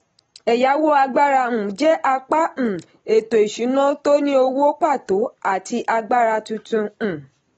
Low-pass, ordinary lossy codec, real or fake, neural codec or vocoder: 10.8 kHz; AAC, 24 kbps; real; none